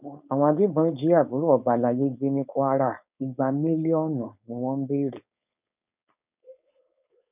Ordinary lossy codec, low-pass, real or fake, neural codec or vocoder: none; 3.6 kHz; fake; codec, 16 kHz, 4.8 kbps, FACodec